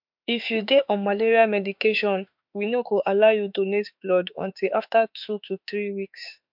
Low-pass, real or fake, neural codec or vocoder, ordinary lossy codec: 5.4 kHz; fake; autoencoder, 48 kHz, 32 numbers a frame, DAC-VAE, trained on Japanese speech; MP3, 48 kbps